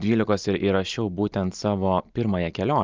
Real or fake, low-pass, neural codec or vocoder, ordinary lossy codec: real; 7.2 kHz; none; Opus, 24 kbps